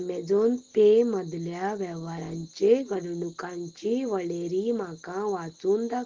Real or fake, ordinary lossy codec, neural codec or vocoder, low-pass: real; Opus, 16 kbps; none; 7.2 kHz